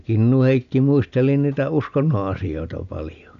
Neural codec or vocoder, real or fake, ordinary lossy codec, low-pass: none; real; MP3, 96 kbps; 7.2 kHz